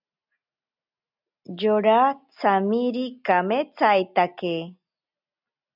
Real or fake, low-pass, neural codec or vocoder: real; 5.4 kHz; none